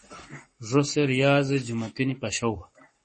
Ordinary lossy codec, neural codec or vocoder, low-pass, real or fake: MP3, 32 kbps; codec, 44.1 kHz, 7.8 kbps, Pupu-Codec; 10.8 kHz; fake